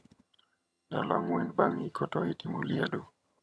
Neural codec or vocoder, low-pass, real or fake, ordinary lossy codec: vocoder, 22.05 kHz, 80 mel bands, HiFi-GAN; none; fake; none